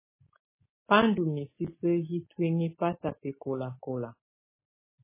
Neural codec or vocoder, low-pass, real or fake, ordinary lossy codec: none; 3.6 kHz; real; MP3, 16 kbps